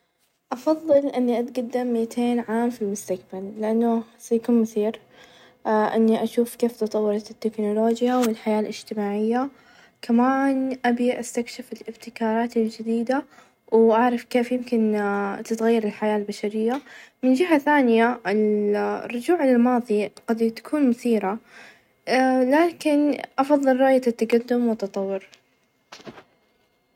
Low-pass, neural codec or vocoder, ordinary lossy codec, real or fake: 19.8 kHz; none; none; real